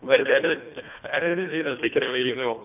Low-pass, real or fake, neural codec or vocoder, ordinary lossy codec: 3.6 kHz; fake; codec, 24 kHz, 1.5 kbps, HILCodec; none